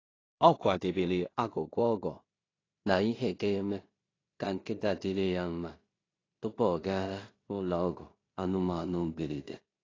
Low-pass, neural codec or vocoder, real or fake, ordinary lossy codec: 7.2 kHz; codec, 16 kHz in and 24 kHz out, 0.4 kbps, LongCat-Audio-Codec, two codebook decoder; fake; AAC, 32 kbps